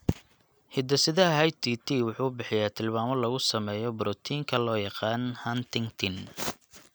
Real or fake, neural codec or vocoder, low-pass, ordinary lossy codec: real; none; none; none